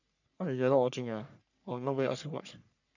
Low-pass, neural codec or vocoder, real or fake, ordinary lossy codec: 7.2 kHz; codec, 44.1 kHz, 3.4 kbps, Pupu-Codec; fake; none